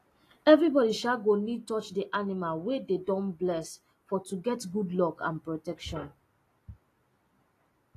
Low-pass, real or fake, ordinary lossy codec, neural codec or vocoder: 14.4 kHz; real; AAC, 48 kbps; none